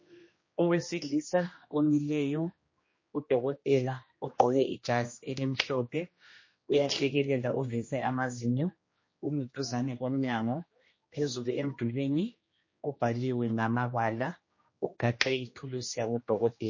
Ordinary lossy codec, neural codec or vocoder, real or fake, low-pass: MP3, 32 kbps; codec, 16 kHz, 1 kbps, X-Codec, HuBERT features, trained on general audio; fake; 7.2 kHz